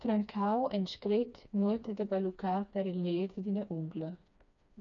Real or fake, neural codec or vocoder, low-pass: fake; codec, 16 kHz, 2 kbps, FreqCodec, smaller model; 7.2 kHz